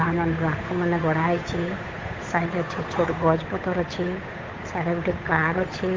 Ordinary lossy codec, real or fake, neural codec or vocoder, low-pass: Opus, 32 kbps; fake; codec, 16 kHz, 8 kbps, FunCodec, trained on Chinese and English, 25 frames a second; 7.2 kHz